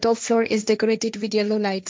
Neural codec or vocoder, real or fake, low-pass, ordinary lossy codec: codec, 16 kHz, 1.1 kbps, Voila-Tokenizer; fake; none; none